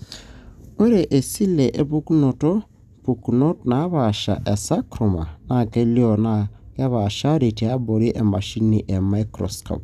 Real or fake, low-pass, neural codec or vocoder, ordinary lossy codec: real; 14.4 kHz; none; none